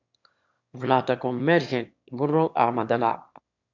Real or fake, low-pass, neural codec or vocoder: fake; 7.2 kHz; autoencoder, 22.05 kHz, a latent of 192 numbers a frame, VITS, trained on one speaker